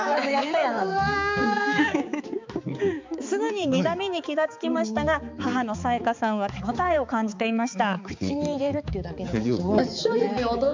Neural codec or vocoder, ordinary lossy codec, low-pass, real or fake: codec, 16 kHz, 4 kbps, X-Codec, HuBERT features, trained on balanced general audio; MP3, 64 kbps; 7.2 kHz; fake